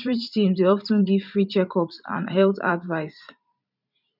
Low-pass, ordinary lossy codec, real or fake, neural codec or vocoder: 5.4 kHz; none; fake; vocoder, 44.1 kHz, 128 mel bands every 512 samples, BigVGAN v2